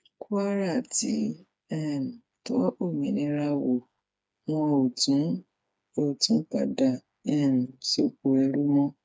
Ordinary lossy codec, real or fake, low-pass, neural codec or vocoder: none; fake; none; codec, 16 kHz, 4 kbps, FreqCodec, smaller model